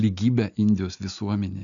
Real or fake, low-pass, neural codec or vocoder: real; 7.2 kHz; none